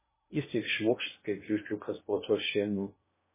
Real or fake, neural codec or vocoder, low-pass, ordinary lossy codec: fake; codec, 16 kHz in and 24 kHz out, 0.8 kbps, FocalCodec, streaming, 65536 codes; 3.6 kHz; MP3, 16 kbps